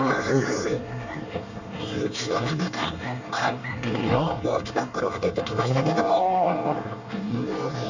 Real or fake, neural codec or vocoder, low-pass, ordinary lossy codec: fake; codec, 24 kHz, 1 kbps, SNAC; 7.2 kHz; Opus, 64 kbps